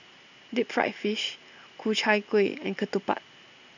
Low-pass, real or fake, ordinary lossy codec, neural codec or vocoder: 7.2 kHz; real; none; none